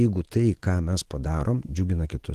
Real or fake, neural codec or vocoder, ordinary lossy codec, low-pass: fake; autoencoder, 48 kHz, 128 numbers a frame, DAC-VAE, trained on Japanese speech; Opus, 16 kbps; 14.4 kHz